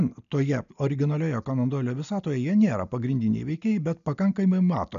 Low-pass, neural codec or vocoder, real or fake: 7.2 kHz; none; real